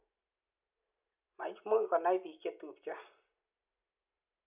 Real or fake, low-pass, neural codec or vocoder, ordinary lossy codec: real; 3.6 kHz; none; none